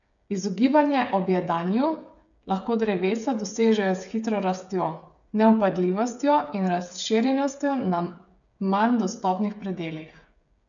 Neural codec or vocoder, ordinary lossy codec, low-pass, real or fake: codec, 16 kHz, 8 kbps, FreqCodec, smaller model; none; 7.2 kHz; fake